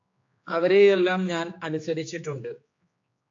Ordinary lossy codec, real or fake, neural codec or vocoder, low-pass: AAC, 48 kbps; fake; codec, 16 kHz, 2 kbps, X-Codec, HuBERT features, trained on general audio; 7.2 kHz